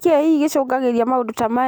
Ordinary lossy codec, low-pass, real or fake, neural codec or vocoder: none; none; real; none